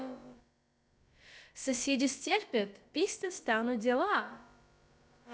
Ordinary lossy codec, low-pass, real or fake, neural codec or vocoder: none; none; fake; codec, 16 kHz, about 1 kbps, DyCAST, with the encoder's durations